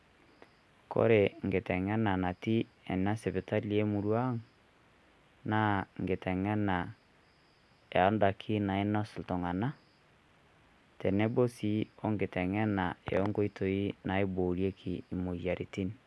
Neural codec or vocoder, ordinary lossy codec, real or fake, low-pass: none; none; real; none